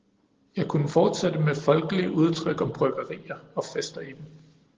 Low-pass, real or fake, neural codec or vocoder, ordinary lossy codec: 7.2 kHz; real; none; Opus, 16 kbps